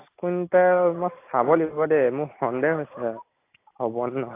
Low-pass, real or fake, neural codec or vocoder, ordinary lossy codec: 3.6 kHz; real; none; AAC, 24 kbps